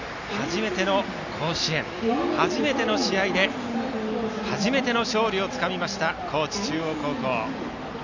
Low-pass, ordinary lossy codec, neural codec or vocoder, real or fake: 7.2 kHz; none; none; real